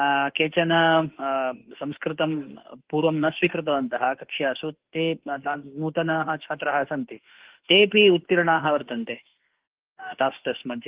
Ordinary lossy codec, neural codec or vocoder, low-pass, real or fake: Opus, 16 kbps; autoencoder, 48 kHz, 32 numbers a frame, DAC-VAE, trained on Japanese speech; 3.6 kHz; fake